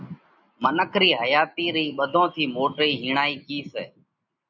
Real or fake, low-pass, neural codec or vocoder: real; 7.2 kHz; none